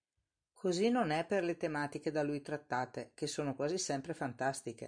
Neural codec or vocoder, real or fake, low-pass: vocoder, 44.1 kHz, 128 mel bands every 256 samples, BigVGAN v2; fake; 9.9 kHz